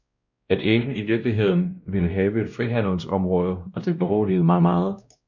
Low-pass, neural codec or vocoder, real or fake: 7.2 kHz; codec, 16 kHz, 1 kbps, X-Codec, WavLM features, trained on Multilingual LibriSpeech; fake